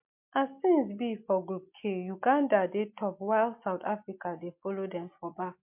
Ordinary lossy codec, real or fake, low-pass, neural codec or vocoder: MP3, 32 kbps; real; 3.6 kHz; none